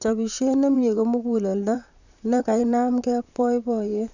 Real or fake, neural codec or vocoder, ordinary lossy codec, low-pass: fake; vocoder, 44.1 kHz, 128 mel bands, Pupu-Vocoder; none; 7.2 kHz